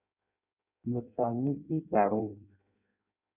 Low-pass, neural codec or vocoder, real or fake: 3.6 kHz; codec, 16 kHz in and 24 kHz out, 0.6 kbps, FireRedTTS-2 codec; fake